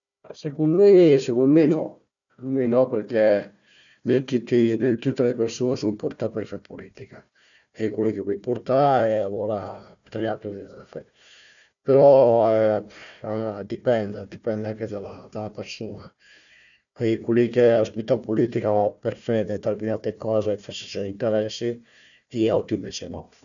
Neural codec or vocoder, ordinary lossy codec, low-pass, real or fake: codec, 16 kHz, 1 kbps, FunCodec, trained on Chinese and English, 50 frames a second; none; 7.2 kHz; fake